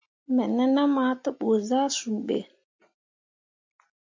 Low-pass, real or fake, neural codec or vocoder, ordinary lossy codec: 7.2 kHz; real; none; MP3, 48 kbps